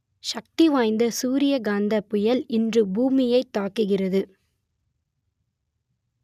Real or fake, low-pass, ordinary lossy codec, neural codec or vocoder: real; 14.4 kHz; none; none